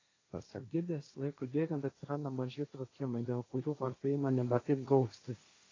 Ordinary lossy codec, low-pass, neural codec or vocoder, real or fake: AAC, 32 kbps; 7.2 kHz; codec, 16 kHz, 1.1 kbps, Voila-Tokenizer; fake